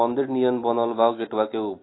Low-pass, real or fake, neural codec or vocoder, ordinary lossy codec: 7.2 kHz; real; none; AAC, 16 kbps